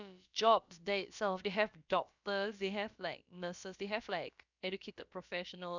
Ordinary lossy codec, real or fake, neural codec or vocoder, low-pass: none; fake; codec, 16 kHz, about 1 kbps, DyCAST, with the encoder's durations; 7.2 kHz